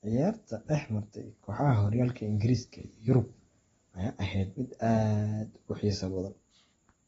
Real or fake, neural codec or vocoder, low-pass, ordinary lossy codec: real; none; 19.8 kHz; AAC, 24 kbps